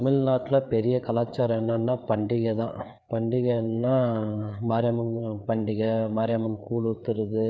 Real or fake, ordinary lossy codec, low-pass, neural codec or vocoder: fake; none; none; codec, 16 kHz, 4 kbps, FreqCodec, larger model